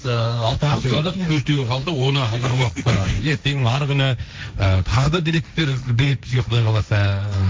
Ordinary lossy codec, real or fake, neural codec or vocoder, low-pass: none; fake; codec, 16 kHz, 1.1 kbps, Voila-Tokenizer; none